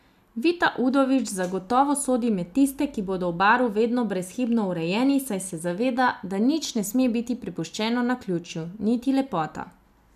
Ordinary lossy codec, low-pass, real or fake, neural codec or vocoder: none; 14.4 kHz; real; none